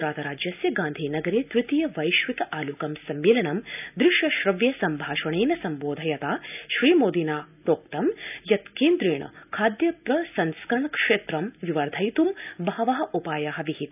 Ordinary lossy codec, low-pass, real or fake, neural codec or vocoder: none; 3.6 kHz; real; none